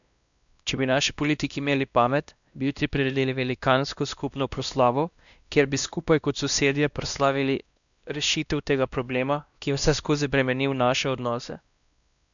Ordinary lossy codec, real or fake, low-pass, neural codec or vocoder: none; fake; 7.2 kHz; codec, 16 kHz, 1 kbps, X-Codec, WavLM features, trained on Multilingual LibriSpeech